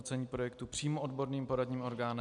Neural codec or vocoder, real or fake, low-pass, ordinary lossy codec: none; real; 10.8 kHz; MP3, 96 kbps